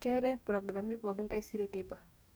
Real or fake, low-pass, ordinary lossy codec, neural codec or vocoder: fake; none; none; codec, 44.1 kHz, 2.6 kbps, DAC